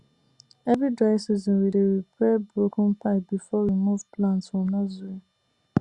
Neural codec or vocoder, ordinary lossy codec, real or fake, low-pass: none; Opus, 64 kbps; real; 10.8 kHz